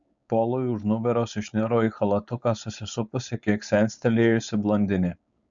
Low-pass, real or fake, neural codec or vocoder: 7.2 kHz; fake; codec, 16 kHz, 4.8 kbps, FACodec